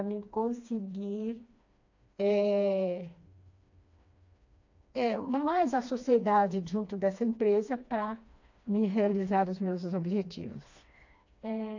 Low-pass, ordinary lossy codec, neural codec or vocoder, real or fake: 7.2 kHz; AAC, 48 kbps; codec, 16 kHz, 2 kbps, FreqCodec, smaller model; fake